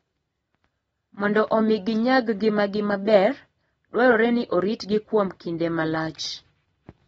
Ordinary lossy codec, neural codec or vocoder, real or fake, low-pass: AAC, 24 kbps; none; real; 19.8 kHz